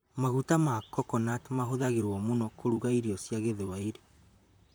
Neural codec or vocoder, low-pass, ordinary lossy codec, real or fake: vocoder, 44.1 kHz, 128 mel bands, Pupu-Vocoder; none; none; fake